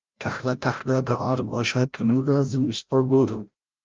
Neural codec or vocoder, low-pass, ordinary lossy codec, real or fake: codec, 16 kHz, 0.5 kbps, FreqCodec, larger model; 7.2 kHz; Opus, 24 kbps; fake